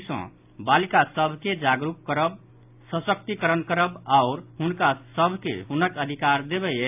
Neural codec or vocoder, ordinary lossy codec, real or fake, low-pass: none; AAC, 32 kbps; real; 3.6 kHz